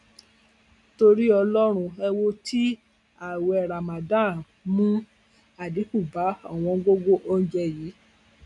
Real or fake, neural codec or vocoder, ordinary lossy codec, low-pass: real; none; none; 10.8 kHz